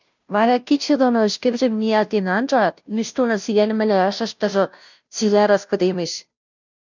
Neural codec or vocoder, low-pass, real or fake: codec, 16 kHz, 0.5 kbps, FunCodec, trained on Chinese and English, 25 frames a second; 7.2 kHz; fake